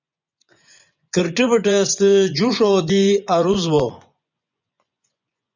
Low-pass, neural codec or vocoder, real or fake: 7.2 kHz; vocoder, 24 kHz, 100 mel bands, Vocos; fake